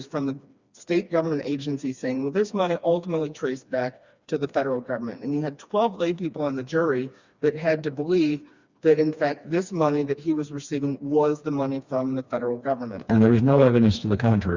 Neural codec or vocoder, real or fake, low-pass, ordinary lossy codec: codec, 16 kHz, 2 kbps, FreqCodec, smaller model; fake; 7.2 kHz; Opus, 64 kbps